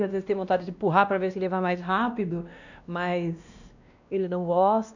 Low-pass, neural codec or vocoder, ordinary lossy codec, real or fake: 7.2 kHz; codec, 16 kHz, 1 kbps, X-Codec, WavLM features, trained on Multilingual LibriSpeech; none; fake